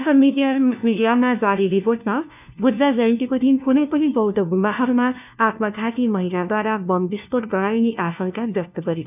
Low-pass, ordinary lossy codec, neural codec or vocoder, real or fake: 3.6 kHz; none; codec, 16 kHz, 1 kbps, FunCodec, trained on LibriTTS, 50 frames a second; fake